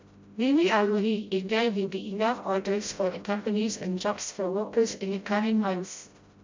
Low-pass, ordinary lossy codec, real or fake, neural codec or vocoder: 7.2 kHz; MP3, 64 kbps; fake; codec, 16 kHz, 0.5 kbps, FreqCodec, smaller model